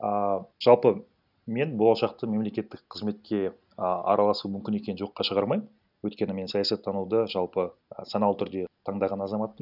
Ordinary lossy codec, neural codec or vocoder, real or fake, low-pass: none; none; real; 5.4 kHz